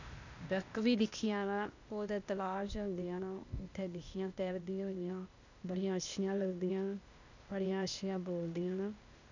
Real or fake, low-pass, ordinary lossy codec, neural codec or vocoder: fake; 7.2 kHz; none; codec, 16 kHz, 0.8 kbps, ZipCodec